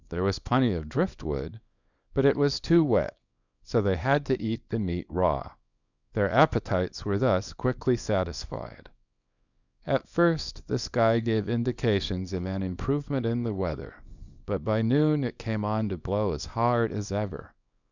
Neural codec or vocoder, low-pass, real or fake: codec, 24 kHz, 0.9 kbps, WavTokenizer, small release; 7.2 kHz; fake